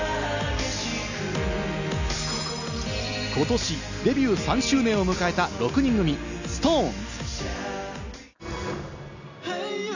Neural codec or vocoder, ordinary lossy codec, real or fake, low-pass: none; none; real; 7.2 kHz